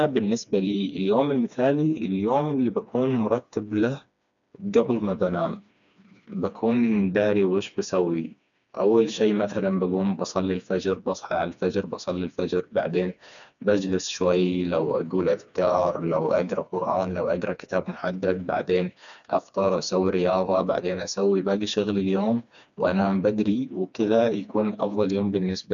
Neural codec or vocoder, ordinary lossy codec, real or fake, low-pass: codec, 16 kHz, 2 kbps, FreqCodec, smaller model; none; fake; 7.2 kHz